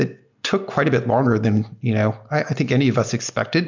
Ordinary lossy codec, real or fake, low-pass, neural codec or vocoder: MP3, 64 kbps; real; 7.2 kHz; none